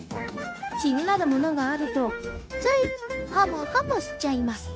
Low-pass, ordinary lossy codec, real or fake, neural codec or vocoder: none; none; fake; codec, 16 kHz, 0.9 kbps, LongCat-Audio-Codec